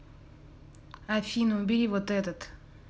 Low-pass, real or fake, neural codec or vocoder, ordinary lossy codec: none; real; none; none